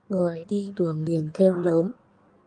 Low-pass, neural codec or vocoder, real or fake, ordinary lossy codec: 9.9 kHz; codec, 16 kHz in and 24 kHz out, 1.1 kbps, FireRedTTS-2 codec; fake; Opus, 32 kbps